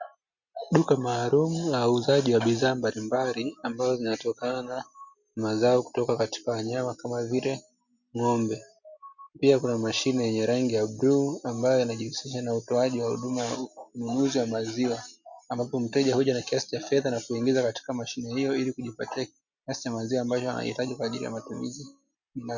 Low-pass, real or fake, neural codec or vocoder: 7.2 kHz; real; none